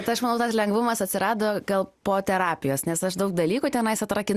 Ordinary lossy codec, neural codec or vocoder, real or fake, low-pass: Opus, 64 kbps; none; real; 14.4 kHz